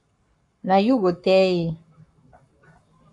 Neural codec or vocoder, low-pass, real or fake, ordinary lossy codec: codec, 44.1 kHz, 7.8 kbps, Pupu-Codec; 10.8 kHz; fake; MP3, 64 kbps